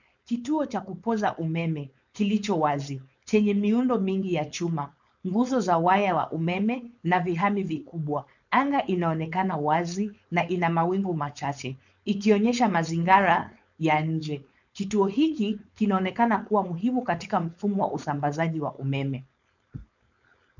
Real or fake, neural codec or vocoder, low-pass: fake; codec, 16 kHz, 4.8 kbps, FACodec; 7.2 kHz